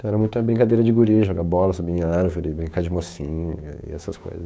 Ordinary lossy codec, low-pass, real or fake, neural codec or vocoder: none; none; fake; codec, 16 kHz, 6 kbps, DAC